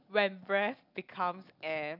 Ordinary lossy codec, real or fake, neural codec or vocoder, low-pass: none; real; none; 5.4 kHz